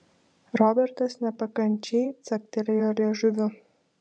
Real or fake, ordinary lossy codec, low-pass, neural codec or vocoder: fake; MP3, 64 kbps; 9.9 kHz; vocoder, 44.1 kHz, 128 mel bands every 512 samples, BigVGAN v2